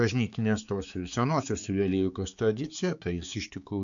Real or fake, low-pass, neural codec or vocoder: fake; 7.2 kHz; codec, 16 kHz, 4 kbps, X-Codec, HuBERT features, trained on balanced general audio